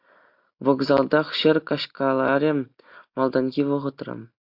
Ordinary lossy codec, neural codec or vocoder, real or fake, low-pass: AAC, 48 kbps; none; real; 5.4 kHz